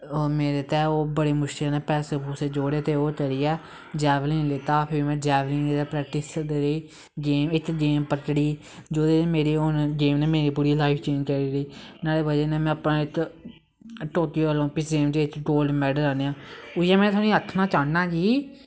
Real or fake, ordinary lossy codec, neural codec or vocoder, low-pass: real; none; none; none